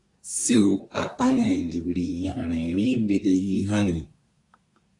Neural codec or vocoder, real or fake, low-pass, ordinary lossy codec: codec, 24 kHz, 1 kbps, SNAC; fake; 10.8 kHz; AAC, 48 kbps